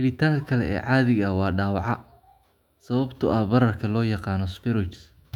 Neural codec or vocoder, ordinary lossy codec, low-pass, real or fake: autoencoder, 48 kHz, 128 numbers a frame, DAC-VAE, trained on Japanese speech; none; 19.8 kHz; fake